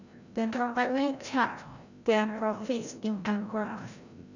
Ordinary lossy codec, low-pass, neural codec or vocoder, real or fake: none; 7.2 kHz; codec, 16 kHz, 0.5 kbps, FreqCodec, larger model; fake